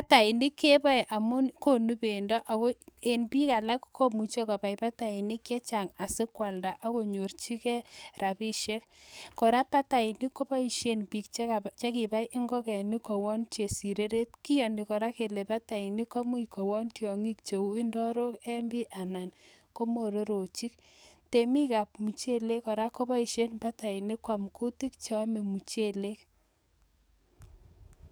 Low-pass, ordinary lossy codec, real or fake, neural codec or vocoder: none; none; fake; codec, 44.1 kHz, 7.8 kbps, DAC